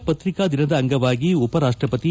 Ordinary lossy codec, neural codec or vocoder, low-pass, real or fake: none; none; none; real